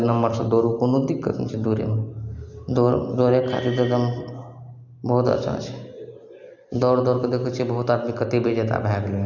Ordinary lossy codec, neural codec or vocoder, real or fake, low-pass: none; none; real; 7.2 kHz